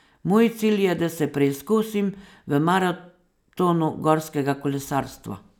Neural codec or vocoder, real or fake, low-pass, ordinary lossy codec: none; real; 19.8 kHz; none